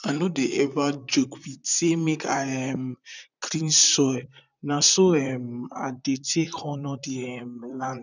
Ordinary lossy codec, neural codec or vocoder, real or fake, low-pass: none; vocoder, 44.1 kHz, 128 mel bands, Pupu-Vocoder; fake; 7.2 kHz